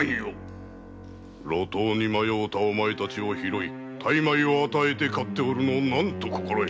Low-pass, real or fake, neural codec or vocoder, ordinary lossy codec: none; real; none; none